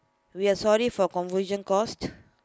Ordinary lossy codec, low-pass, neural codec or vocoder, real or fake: none; none; none; real